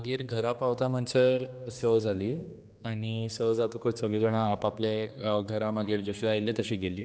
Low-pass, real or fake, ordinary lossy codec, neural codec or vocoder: none; fake; none; codec, 16 kHz, 2 kbps, X-Codec, HuBERT features, trained on general audio